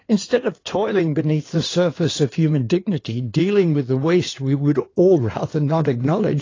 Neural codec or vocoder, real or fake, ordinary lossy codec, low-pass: codec, 16 kHz in and 24 kHz out, 2.2 kbps, FireRedTTS-2 codec; fake; AAC, 32 kbps; 7.2 kHz